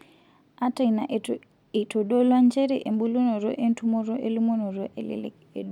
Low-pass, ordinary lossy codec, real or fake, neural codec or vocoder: 19.8 kHz; MP3, 96 kbps; real; none